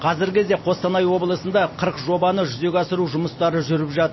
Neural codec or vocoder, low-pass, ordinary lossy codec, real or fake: none; 7.2 kHz; MP3, 24 kbps; real